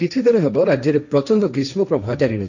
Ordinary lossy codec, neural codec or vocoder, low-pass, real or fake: none; codec, 16 kHz, 1.1 kbps, Voila-Tokenizer; 7.2 kHz; fake